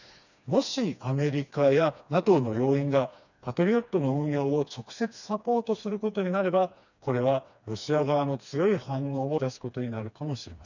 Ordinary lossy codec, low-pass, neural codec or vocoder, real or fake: none; 7.2 kHz; codec, 16 kHz, 2 kbps, FreqCodec, smaller model; fake